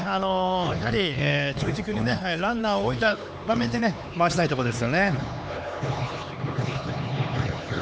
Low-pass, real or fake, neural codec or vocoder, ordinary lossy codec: none; fake; codec, 16 kHz, 4 kbps, X-Codec, HuBERT features, trained on LibriSpeech; none